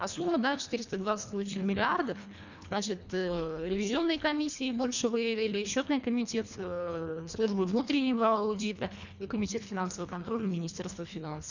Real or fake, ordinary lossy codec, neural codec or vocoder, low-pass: fake; none; codec, 24 kHz, 1.5 kbps, HILCodec; 7.2 kHz